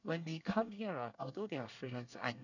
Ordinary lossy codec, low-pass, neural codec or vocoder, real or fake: none; 7.2 kHz; codec, 24 kHz, 1 kbps, SNAC; fake